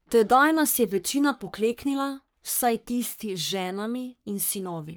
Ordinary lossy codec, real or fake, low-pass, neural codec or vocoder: none; fake; none; codec, 44.1 kHz, 3.4 kbps, Pupu-Codec